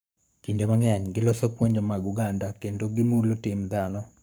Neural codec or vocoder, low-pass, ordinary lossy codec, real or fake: codec, 44.1 kHz, 7.8 kbps, Pupu-Codec; none; none; fake